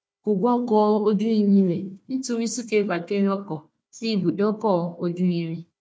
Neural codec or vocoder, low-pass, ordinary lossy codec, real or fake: codec, 16 kHz, 1 kbps, FunCodec, trained on Chinese and English, 50 frames a second; none; none; fake